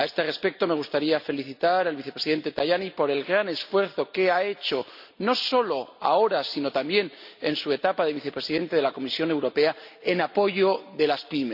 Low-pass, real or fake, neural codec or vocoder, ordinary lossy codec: 5.4 kHz; real; none; none